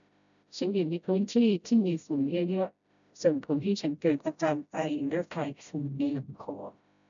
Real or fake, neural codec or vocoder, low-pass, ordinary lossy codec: fake; codec, 16 kHz, 0.5 kbps, FreqCodec, smaller model; 7.2 kHz; none